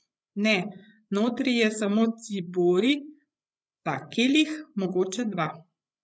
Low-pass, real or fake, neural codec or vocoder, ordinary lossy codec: none; fake; codec, 16 kHz, 16 kbps, FreqCodec, larger model; none